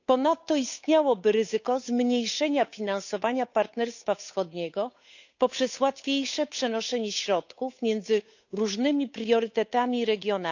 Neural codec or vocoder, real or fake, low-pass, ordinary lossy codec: codec, 16 kHz, 8 kbps, FunCodec, trained on Chinese and English, 25 frames a second; fake; 7.2 kHz; none